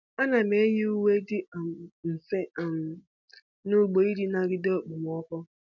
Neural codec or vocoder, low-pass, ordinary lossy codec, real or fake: none; 7.2 kHz; none; real